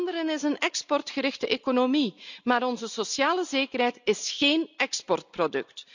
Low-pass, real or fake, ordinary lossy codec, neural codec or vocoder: 7.2 kHz; real; none; none